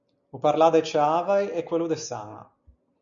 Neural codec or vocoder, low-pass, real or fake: none; 7.2 kHz; real